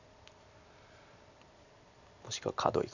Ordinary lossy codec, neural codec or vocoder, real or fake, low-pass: none; none; real; 7.2 kHz